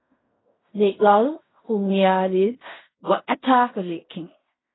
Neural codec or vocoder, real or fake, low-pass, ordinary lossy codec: codec, 16 kHz in and 24 kHz out, 0.4 kbps, LongCat-Audio-Codec, fine tuned four codebook decoder; fake; 7.2 kHz; AAC, 16 kbps